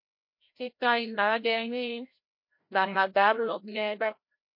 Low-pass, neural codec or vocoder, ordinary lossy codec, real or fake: 5.4 kHz; codec, 16 kHz, 0.5 kbps, FreqCodec, larger model; MP3, 32 kbps; fake